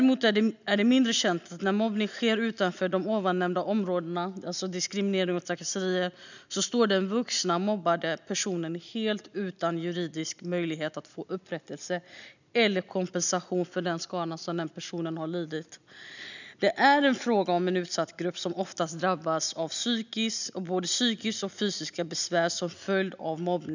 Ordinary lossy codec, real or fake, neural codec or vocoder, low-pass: none; real; none; 7.2 kHz